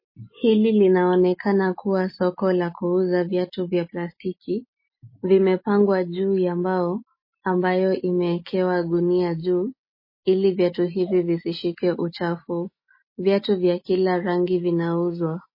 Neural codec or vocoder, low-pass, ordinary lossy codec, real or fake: none; 5.4 kHz; MP3, 24 kbps; real